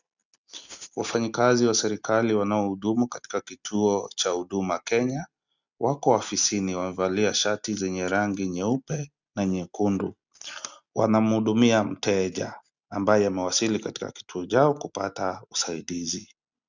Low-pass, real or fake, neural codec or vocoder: 7.2 kHz; real; none